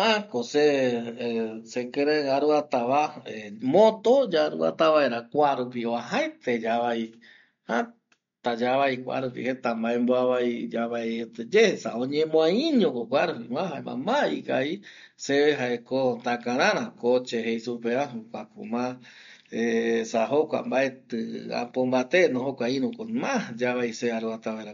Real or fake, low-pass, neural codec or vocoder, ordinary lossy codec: real; 7.2 kHz; none; MP3, 48 kbps